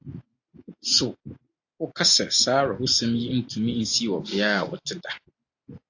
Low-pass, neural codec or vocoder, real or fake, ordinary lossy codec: 7.2 kHz; none; real; AAC, 48 kbps